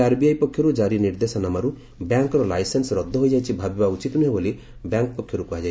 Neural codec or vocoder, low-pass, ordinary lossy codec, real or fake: none; none; none; real